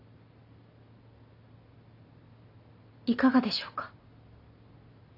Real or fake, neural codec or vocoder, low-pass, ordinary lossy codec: real; none; 5.4 kHz; none